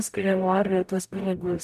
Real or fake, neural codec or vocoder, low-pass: fake; codec, 44.1 kHz, 0.9 kbps, DAC; 14.4 kHz